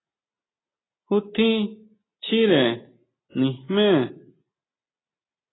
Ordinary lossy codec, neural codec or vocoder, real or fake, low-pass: AAC, 16 kbps; none; real; 7.2 kHz